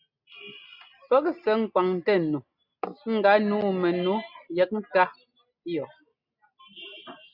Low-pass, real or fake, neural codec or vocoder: 5.4 kHz; real; none